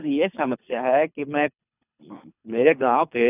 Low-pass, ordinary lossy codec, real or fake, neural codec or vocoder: 3.6 kHz; none; fake; codec, 24 kHz, 3 kbps, HILCodec